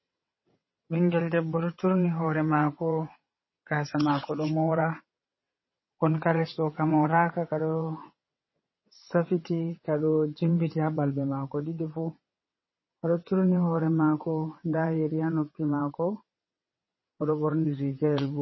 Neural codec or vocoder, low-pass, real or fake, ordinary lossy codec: vocoder, 22.05 kHz, 80 mel bands, WaveNeXt; 7.2 kHz; fake; MP3, 24 kbps